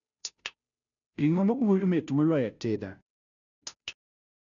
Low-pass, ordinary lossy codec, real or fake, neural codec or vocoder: 7.2 kHz; none; fake; codec, 16 kHz, 0.5 kbps, FunCodec, trained on Chinese and English, 25 frames a second